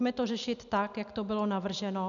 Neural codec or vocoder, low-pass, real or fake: none; 7.2 kHz; real